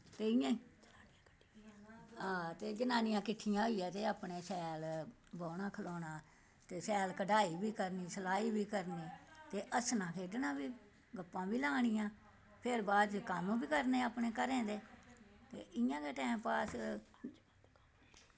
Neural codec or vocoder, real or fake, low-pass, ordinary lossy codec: none; real; none; none